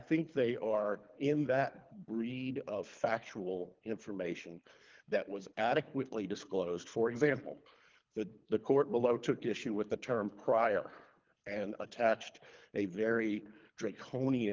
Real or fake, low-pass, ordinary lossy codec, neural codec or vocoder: fake; 7.2 kHz; Opus, 32 kbps; codec, 24 kHz, 3 kbps, HILCodec